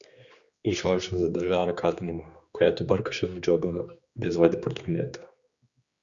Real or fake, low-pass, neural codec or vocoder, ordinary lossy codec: fake; 7.2 kHz; codec, 16 kHz, 2 kbps, X-Codec, HuBERT features, trained on general audio; Opus, 64 kbps